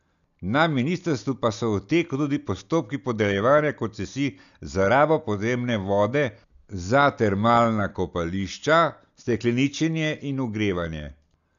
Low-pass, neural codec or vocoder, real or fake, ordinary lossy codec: 7.2 kHz; none; real; none